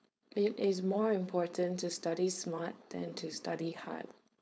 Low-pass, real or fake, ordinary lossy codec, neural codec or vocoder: none; fake; none; codec, 16 kHz, 4.8 kbps, FACodec